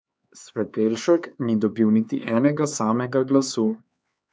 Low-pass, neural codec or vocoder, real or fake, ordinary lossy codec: none; codec, 16 kHz, 4 kbps, X-Codec, HuBERT features, trained on LibriSpeech; fake; none